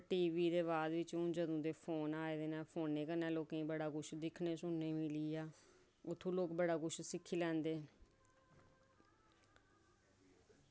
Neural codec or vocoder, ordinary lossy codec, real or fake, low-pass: none; none; real; none